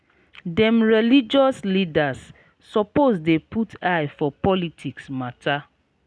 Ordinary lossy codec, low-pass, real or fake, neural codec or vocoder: none; none; real; none